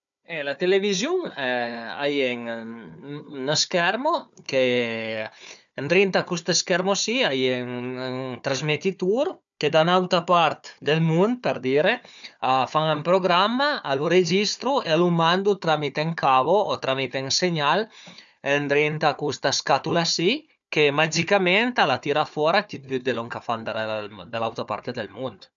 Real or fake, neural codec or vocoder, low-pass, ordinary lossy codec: fake; codec, 16 kHz, 4 kbps, FunCodec, trained on Chinese and English, 50 frames a second; 7.2 kHz; none